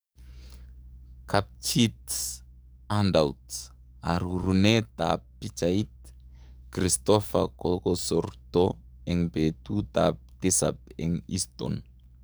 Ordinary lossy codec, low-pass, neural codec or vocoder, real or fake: none; none; codec, 44.1 kHz, 7.8 kbps, DAC; fake